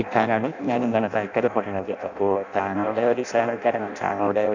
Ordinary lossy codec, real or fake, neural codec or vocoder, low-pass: none; fake; codec, 16 kHz in and 24 kHz out, 0.6 kbps, FireRedTTS-2 codec; 7.2 kHz